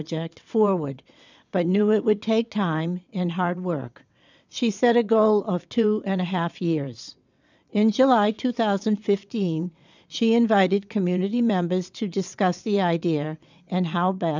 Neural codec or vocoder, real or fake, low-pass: vocoder, 22.05 kHz, 80 mel bands, WaveNeXt; fake; 7.2 kHz